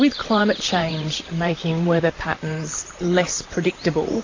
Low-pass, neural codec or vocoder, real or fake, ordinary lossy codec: 7.2 kHz; vocoder, 44.1 kHz, 128 mel bands, Pupu-Vocoder; fake; AAC, 32 kbps